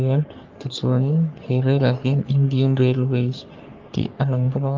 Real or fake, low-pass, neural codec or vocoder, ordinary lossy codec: fake; 7.2 kHz; codec, 44.1 kHz, 3.4 kbps, Pupu-Codec; Opus, 32 kbps